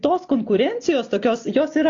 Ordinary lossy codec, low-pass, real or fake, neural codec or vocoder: AAC, 48 kbps; 7.2 kHz; real; none